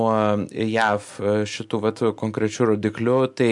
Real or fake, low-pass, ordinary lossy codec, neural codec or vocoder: real; 10.8 kHz; MP3, 64 kbps; none